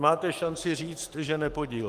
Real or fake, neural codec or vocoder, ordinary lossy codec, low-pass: fake; autoencoder, 48 kHz, 128 numbers a frame, DAC-VAE, trained on Japanese speech; Opus, 24 kbps; 14.4 kHz